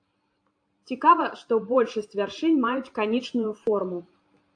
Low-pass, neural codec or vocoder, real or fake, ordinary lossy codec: 9.9 kHz; vocoder, 44.1 kHz, 128 mel bands every 512 samples, BigVGAN v2; fake; AAC, 48 kbps